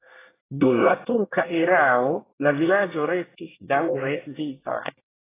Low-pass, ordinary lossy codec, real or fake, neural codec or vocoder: 3.6 kHz; AAC, 16 kbps; fake; codec, 24 kHz, 1 kbps, SNAC